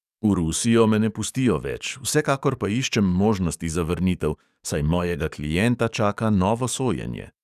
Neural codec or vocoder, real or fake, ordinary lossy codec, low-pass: codec, 44.1 kHz, 7.8 kbps, DAC; fake; none; 14.4 kHz